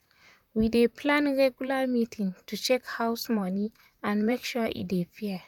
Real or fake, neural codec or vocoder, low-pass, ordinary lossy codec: fake; vocoder, 44.1 kHz, 128 mel bands, Pupu-Vocoder; 19.8 kHz; none